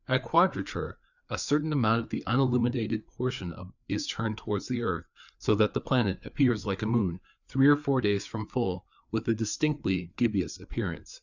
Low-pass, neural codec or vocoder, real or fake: 7.2 kHz; codec, 16 kHz, 4 kbps, FreqCodec, larger model; fake